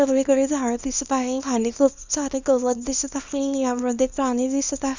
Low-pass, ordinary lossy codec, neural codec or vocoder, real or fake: 7.2 kHz; Opus, 64 kbps; codec, 24 kHz, 0.9 kbps, WavTokenizer, small release; fake